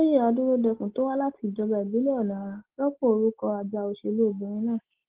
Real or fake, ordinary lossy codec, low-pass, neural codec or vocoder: real; Opus, 16 kbps; 3.6 kHz; none